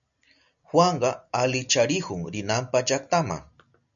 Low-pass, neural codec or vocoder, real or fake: 7.2 kHz; none; real